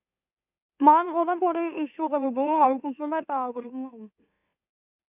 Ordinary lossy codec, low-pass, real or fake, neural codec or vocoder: none; 3.6 kHz; fake; autoencoder, 44.1 kHz, a latent of 192 numbers a frame, MeloTTS